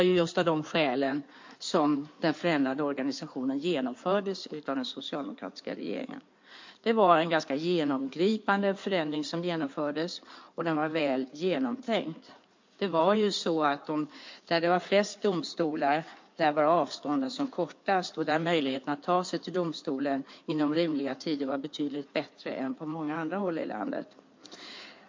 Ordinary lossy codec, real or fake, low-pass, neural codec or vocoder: MP3, 48 kbps; fake; 7.2 kHz; codec, 16 kHz in and 24 kHz out, 2.2 kbps, FireRedTTS-2 codec